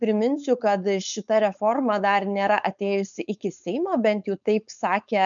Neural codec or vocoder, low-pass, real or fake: codec, 16 kHz, 4.8 kbps, FACodec; 7.2 kHz; fake